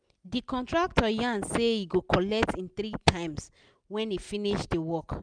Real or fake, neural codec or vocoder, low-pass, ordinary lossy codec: real; none; 9.9 kHz; none